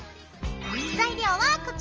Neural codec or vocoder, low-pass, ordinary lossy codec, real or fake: none; 7.2 kHz; Opus, 24 kbps; real